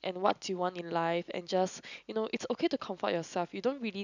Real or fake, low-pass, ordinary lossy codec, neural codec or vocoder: real; 7.2 kHz; none; none